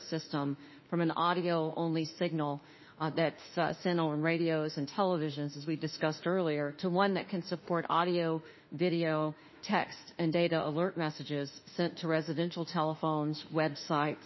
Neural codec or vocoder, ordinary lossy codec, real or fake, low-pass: autoencoder, 48 kHz, 32 numbers a frame, DAC-VAE, trained on Japanese speech; MP3, 24 kbps; fake; 7.2 kHz